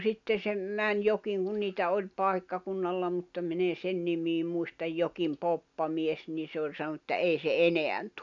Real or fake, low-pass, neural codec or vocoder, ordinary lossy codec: real; 7.2 kHz; none; none